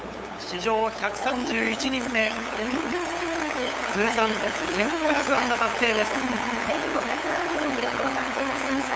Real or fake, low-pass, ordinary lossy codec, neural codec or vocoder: fake; none; none; codec, 16 kHz, 8 kbps, FunCodec, trained on LibriTTS, 25 frames a second